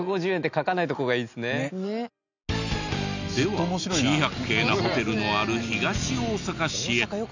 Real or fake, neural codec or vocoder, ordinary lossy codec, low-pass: real; none; none; 7.2 kHz